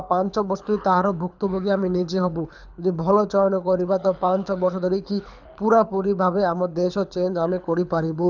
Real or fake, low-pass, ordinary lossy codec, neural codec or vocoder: fake; 7.2 kHz; none; codec, 24 kHz, 6 kbps, HILCodec